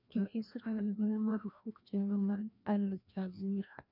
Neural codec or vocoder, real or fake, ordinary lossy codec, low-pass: codec, 16 kHz, 1 kbps, FreqCodec, larger model; fake; none; 5.4 kHz